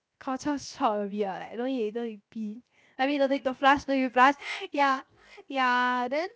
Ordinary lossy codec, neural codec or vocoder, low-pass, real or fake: none; codec, 16 kHz, 0.7 kbps, FocalCodec; none; fake